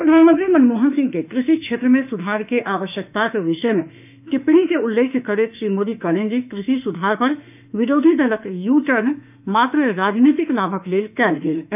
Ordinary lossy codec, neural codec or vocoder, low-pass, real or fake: none; autoencoder, 48 kHz, 32 numbers a frame, DAC-VAE, trained on Japanese speech; 3.6 kHz; fake